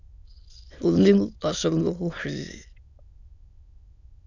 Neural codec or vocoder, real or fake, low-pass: autoencoder, 22.05 kHz, a latent of 192 numbers a frame, VITS, trained on many speakers; fake; 7.2 kHz